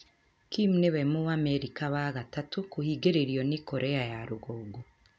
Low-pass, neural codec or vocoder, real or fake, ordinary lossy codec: none; none; real; none